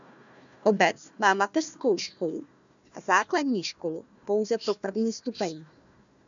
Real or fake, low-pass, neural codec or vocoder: fake; 7.2 kHz; codec, 16 kHz, 1 kbps, FunCodec, trained on Chinese and English, 50 frames a second